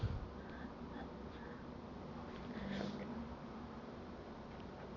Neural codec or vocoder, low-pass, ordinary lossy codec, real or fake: none; 7.2 kHz; none; real